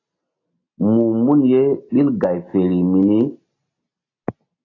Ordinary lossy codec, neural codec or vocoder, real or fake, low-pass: AAC, 32 kbps; none; real; 7.2 kHz